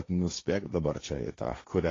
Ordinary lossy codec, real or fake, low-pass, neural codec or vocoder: AAC, 32 kbps; fake; 7.2 kHz; codec, 16 kHz, 1.1 kbps, Voila-Tokenizer